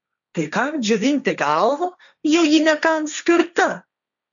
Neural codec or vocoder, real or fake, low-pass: codec, 16 kHz, 1.1 kbps, Voila-Tokenizer; fake; 7.2 kHz